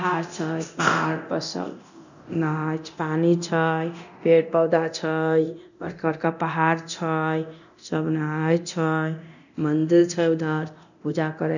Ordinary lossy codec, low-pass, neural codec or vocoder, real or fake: none; 7.2 kHz; codec, 24 kHz, 0.9 kbps, DualCodec; fake